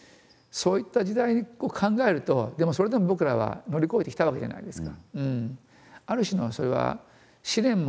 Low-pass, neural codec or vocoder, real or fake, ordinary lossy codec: none; none; real; none